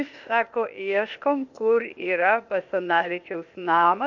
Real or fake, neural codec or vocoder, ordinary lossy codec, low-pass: fake; codec, 16 kHz, 0.8 kbps, ZipCodec; MP3, 48 kbps; 7.2 kHz